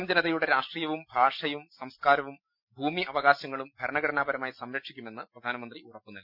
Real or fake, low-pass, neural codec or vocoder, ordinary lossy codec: real; 5.4 kHz; none; none